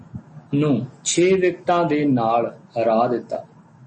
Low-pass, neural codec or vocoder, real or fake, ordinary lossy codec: 10.8 kHz; none; real; MP3, 32 kbps